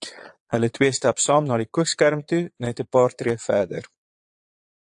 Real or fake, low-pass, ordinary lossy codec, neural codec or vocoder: fake; 9.9 kHz; AAC, 64 kbps; vocoder, 22.05 kHz, 80 mel bands, Vocos